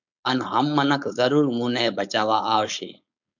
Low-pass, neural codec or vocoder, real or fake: 7.2 kHz; codec, 16 kHz, 4.8 kbps, FACodec; fake